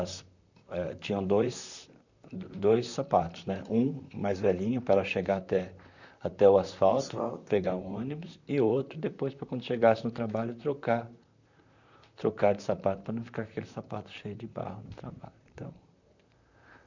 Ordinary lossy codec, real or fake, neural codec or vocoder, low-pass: none; fake; vocoder, 44.1 kHz, 128 mel bands, Pupu-Vocoder; 7.2 kHz